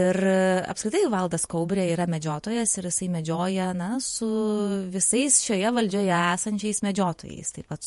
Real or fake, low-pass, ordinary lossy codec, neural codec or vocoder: fake; 14.4 kHz; MP3, 48 kbps; vocoder, 48 kHz, 128 mel bands, Vocos